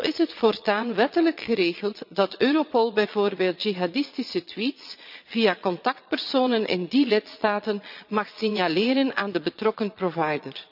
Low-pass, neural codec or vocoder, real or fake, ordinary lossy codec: 5.4 kHz; vocoder, 22.05 kHz, 80 mel bands, Vocos; fake; none